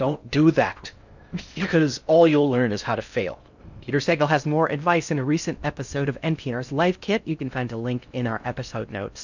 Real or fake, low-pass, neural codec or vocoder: fake; 7.2 kHz; codec, 16 kHz in and 24 kHz out, 0.6 kbps, FocalCodec, streaming, 2048 codes